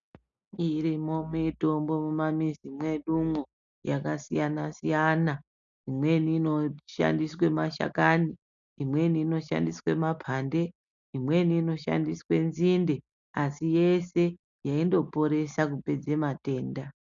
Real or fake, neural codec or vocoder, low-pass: real; none; 7.2 kHz